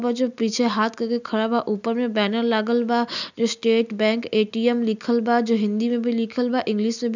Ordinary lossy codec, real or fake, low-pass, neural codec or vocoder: none; real; 7.2 kHz; none